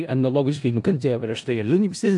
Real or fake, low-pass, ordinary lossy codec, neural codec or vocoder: fake; 10.8 kHz; AAC, 64 kbps; codec, 16 kHz in and 24 kHz out, 0.4 kbps, LongCat-Audio-Codec, four codebook decoder